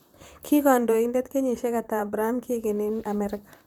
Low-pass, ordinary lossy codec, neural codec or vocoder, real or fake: none; none; vocoder, 44.1 kHz, 128 mel bands, Pupu-Vocoder; fake